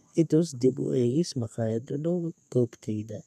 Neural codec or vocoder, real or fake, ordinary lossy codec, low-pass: codec, 32 kHz, 1.9 kbps, SNAC; fake; none; 14.4 kHz